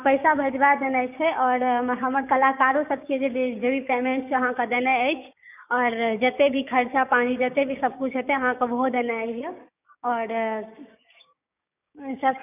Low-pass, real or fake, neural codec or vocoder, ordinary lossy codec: 3.6 kHz; fake; codec, 16 kHz, 6 kbps, DAC; none